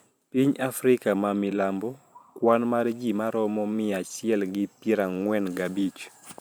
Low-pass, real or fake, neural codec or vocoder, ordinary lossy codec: none; fake; vocoder, 44.1 kHz, 128 mel bands every 512 samples, BigVGAN v2; none